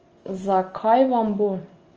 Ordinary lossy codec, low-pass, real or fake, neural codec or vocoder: Opus, 24 kbps; 7.2 kHz; real; none